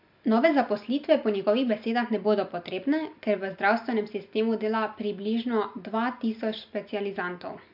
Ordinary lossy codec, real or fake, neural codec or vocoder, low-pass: MP3, 48 kbps; real; none; 5.4 kHz